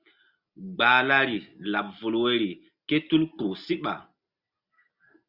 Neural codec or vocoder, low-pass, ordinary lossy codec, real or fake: none; 5.4 kHz; Opus, 64 kbps; real